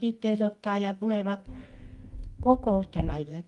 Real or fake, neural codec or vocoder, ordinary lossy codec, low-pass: fake; codec, 24 kHz, 0.9 kbps, WavTokenizer, medium music audio release; Opus, 32 kbps; 10.8 kHz